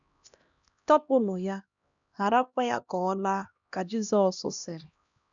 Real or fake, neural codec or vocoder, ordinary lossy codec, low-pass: fake; codec, 16 kHz, 1 kbps, X-Codec, HuBERT features, trained on LibriSpeech; none; 7.2 kHz